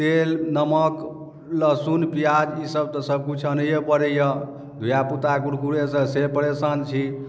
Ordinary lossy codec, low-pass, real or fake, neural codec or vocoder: none; none; real; none